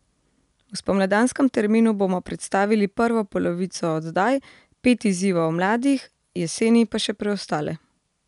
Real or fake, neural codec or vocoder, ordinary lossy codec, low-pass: real; none; none; 10.8 kHz